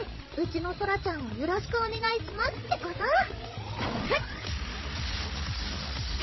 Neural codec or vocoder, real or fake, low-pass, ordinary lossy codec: codec, 16 kHz, 16 kbps, FreqCodec, larger model; fake; 7.2 kHz; MP3, 24 kbps